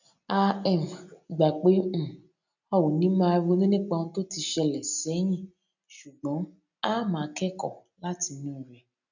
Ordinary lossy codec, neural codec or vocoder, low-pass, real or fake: none; none; 7.2 kHz; real